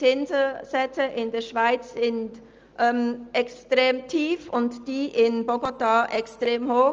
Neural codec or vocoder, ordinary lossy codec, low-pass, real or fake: none; Opus, 24 kbps; 7.2 kHz; real